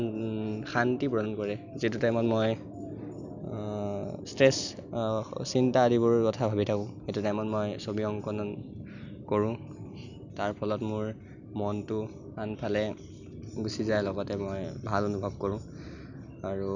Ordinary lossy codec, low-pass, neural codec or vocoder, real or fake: none; 7.2 kHz; none; real